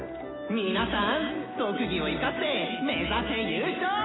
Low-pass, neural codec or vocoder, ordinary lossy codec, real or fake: 7.2 kHz; none; AAC, 16 kbps; real